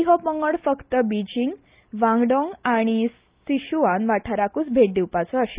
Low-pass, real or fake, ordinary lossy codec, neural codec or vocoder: 3.6 kHz; real; Opus, 32 kbps; none